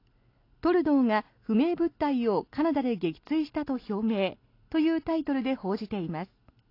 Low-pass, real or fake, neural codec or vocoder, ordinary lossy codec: 5.4 kHz; real; none; AAC, 32 kbps